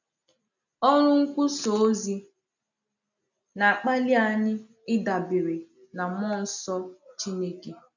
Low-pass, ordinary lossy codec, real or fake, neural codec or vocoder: 7.2 kHz; none; real; none